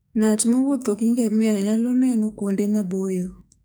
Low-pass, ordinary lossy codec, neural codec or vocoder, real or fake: none; none; codec, 44.1 kHz, 2.6 kbps, SNAC; fake